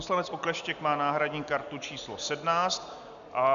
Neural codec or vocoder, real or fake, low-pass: none; real; 7.2 kHz